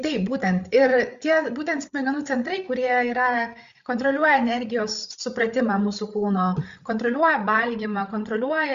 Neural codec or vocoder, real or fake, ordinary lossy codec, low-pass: codec, 16 kHz, 8 kbps, FreqCodec, larger model; fake; Opus, 64 kbps; 7.2 kHz